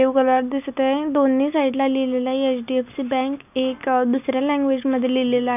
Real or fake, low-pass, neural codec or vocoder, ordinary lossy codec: real; 3.6 kHz; none; none